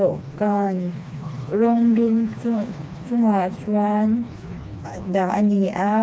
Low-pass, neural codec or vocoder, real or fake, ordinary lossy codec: none; codec, 16 kHz, 2 kbps, FreqCodec, smaller model; fake; none